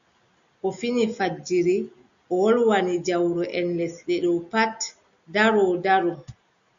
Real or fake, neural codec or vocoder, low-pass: real; none; 7.2 kHz